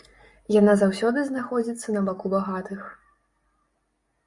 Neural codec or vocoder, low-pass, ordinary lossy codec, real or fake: none; 10.8 kHz; Opus, 64 kbps; real